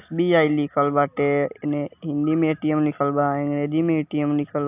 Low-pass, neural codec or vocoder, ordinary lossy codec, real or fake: 3.6 kHz; none; AAC, 32 kbps; real